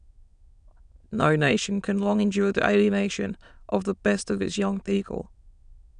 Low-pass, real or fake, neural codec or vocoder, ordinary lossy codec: 9.9 kHz; fake; autoencoder, 22.05 kHz, a latent of 192 numbers a frame, VITS, trained on many speakers; none